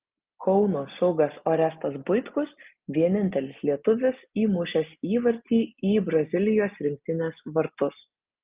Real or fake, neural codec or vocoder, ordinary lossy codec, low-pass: real; none; Opus, 24 kbps; 3.6 kHz